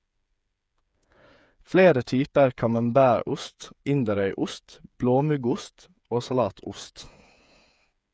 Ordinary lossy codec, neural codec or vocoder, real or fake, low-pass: none; codec, 16 kHz, 8 kbps, FreqCodec, smaller model; fake; none